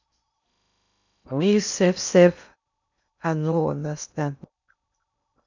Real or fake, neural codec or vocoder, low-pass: fake; codec, 16 kHz in and 24 kHz out, 0.6 kbps, FocalCodec, streaming, 2048 codes; 7.2 kHz